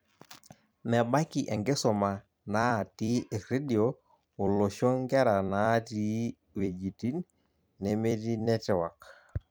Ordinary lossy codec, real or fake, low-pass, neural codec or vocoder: none; fake; none; vocoder, 44.1 kHz, 128 mel bands every 256 samples, BigVGAN v2